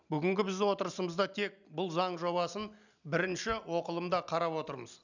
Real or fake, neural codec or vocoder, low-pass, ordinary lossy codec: real; none; 7.2 kHz; none